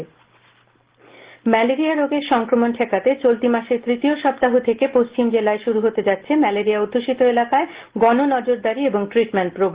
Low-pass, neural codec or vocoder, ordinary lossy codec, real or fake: 3.6 kHz; none; Opus, 16 kbps; real